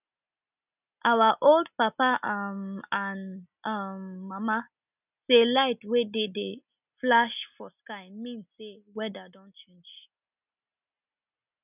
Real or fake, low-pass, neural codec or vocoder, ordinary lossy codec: real; 3.6 kHz; none; none